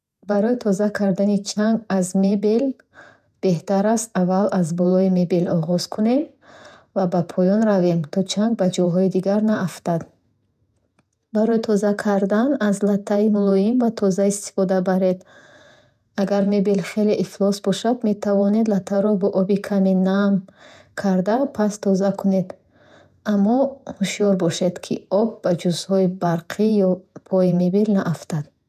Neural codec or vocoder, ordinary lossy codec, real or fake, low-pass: vocoder, 48 kHz, 128 mel bands, Vocos; none; fake; 14.4 kHz